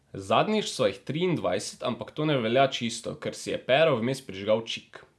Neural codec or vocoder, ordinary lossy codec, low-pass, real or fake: none; none; none; real